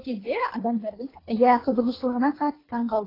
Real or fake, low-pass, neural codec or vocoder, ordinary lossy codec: fake; 5.4 kHz; codec, 24 kHz, 3 kbps, HILCodec; AAC, 24 kbps